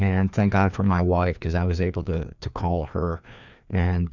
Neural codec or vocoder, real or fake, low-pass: codec, 16 kHz, 2 kbps, FreqCodec, larger model; fake; 7.2 kHz